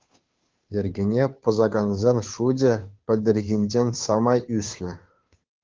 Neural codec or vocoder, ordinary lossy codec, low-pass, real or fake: codec, 16 kHz, 2 kbps, FunCodec, trained on Chinese and English, 25 frames a second; Opus, 32 kbps; 7.2 kHz; fake